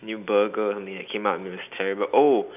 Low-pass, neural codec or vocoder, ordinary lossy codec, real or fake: 3.6 kHz; none; none; real